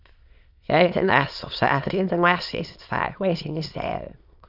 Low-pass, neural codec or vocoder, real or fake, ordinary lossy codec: 5.4 kHz; autoencoder, 22.05 kHz, a latent of 192 numbers a frame, VITS, trained on many speakers; fake; Opus, 64 kbps